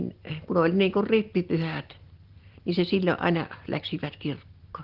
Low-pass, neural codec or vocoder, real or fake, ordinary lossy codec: 5.4 kHz; none; real; Opus, 16 kbps